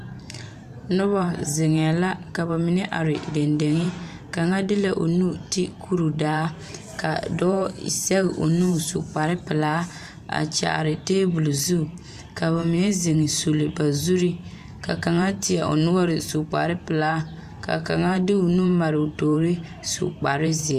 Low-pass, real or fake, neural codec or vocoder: 14.4 kHz; fake; vocoder, 44.1 kHz, 128 mel bands every 512 samples, BigVGAN v2